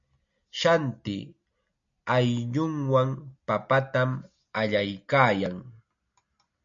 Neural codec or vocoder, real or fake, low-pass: none; real; 7.2 kHz